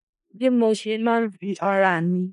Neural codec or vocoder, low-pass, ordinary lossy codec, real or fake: codec, 16 kHz in and 24 kHz out, 0.4 kbps, LongCat-Audio-Codec, four codebook decoder; 10.8 kHz; none; fake